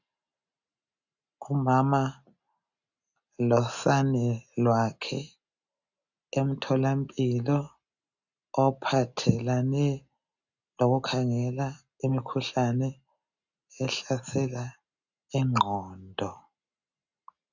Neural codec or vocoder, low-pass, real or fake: none; 7.2 kHz; real